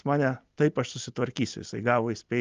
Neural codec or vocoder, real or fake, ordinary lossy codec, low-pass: none; real; Opus, 32 kbps; 7.2 kHz